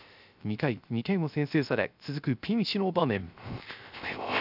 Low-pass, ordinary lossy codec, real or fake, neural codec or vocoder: 5.4 kHz; none; fake; codec, 16 kHz, 0.3 kbps, FocalCodec